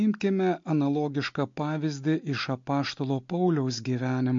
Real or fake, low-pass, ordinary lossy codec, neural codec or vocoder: real; 7.2 kHz; MP3, 48 kbps; none